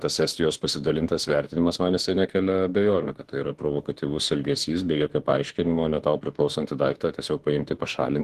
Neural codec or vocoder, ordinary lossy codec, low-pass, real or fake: autoencoder, 48 kHz, 32 numbers a frame, DAC-VAE, trained on Japanese speech; Opus, 16 kbps; 14.4 kHz; fake